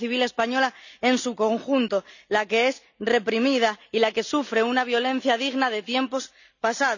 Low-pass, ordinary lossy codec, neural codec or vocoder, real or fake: 7.2 kHz; none; none; real